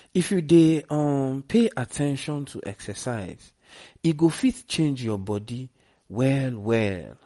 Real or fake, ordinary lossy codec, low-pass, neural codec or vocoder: real; MP3, 48 kbps; 19.8 kHz; none